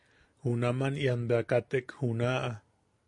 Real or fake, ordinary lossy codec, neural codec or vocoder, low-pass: real; MP3, 48 kbps; none; 10.8 kHz